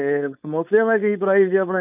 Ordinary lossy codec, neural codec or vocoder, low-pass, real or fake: none; codec, 16 kHz, 4.8 kbps, FACodec; 3.6 kHz; fake